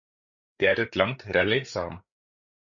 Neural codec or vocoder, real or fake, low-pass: codec, 16 kHz, 8 kbps, FreqCodec, larger model; fake; 7.2 kHz